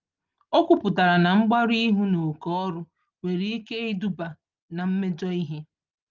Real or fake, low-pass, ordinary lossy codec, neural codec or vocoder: real; 7.2 kHz; Opus, 32 kbps; none